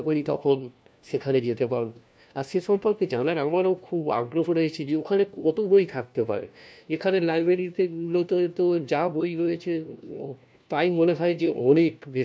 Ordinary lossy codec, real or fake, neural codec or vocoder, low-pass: none; fake; codec, 16 kHz, 1 kbps, FunCodec, trained on LibriTTS, 50 frames a second; none